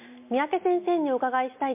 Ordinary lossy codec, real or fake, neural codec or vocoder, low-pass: MP3, 32 kbps; real; none; 3.6 kHz